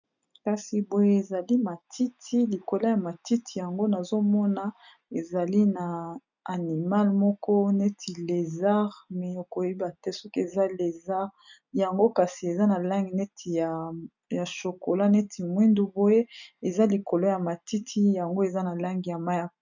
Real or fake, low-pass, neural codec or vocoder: real; 7.2 kHz; none